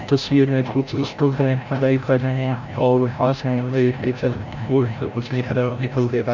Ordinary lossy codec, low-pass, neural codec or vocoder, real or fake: none; 7.2 kHz; codec, 16 kHz, 0.5 kbps, FreqCodec, larger model; fake